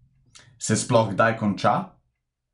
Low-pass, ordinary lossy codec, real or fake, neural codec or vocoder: 10.8 kHz; none; real; none